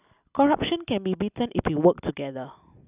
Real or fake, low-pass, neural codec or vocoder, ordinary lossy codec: real; 3.6 kHz; none; none